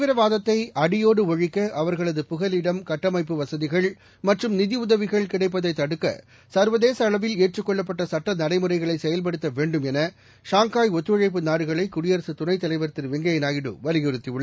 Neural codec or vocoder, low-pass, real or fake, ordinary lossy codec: none; none; real; none